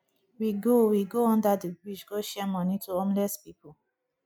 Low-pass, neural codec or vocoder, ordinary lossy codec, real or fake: none; none; none; real